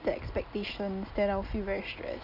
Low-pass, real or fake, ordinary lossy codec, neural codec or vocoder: 5.4 kHz; real; none; none